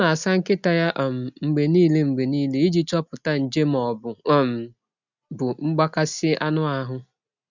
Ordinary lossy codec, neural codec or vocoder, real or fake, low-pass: none; none; real; 7.2 kHz